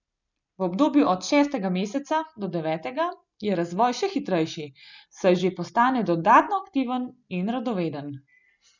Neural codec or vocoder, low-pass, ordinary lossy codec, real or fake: none; 7.2 kHz; none; real